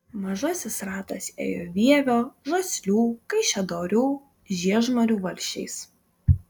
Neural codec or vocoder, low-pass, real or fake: none; 19.8 kHz; real